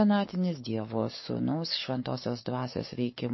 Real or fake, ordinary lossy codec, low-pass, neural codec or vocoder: fake; MP3, 24 kbps; 7.2 kHz; codec, 44.1 kHz, 7.8 kbps, DAC